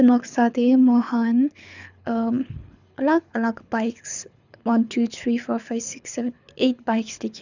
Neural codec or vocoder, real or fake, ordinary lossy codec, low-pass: codec, 24 kHz, 6 kbps, HILCodec; fake; none; 7.2 kHz